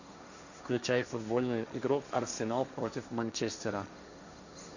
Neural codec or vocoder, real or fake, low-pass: codec, 16 kHz, 1.1 kbps, Voila-Tokenizer; fake; 7.2 kHz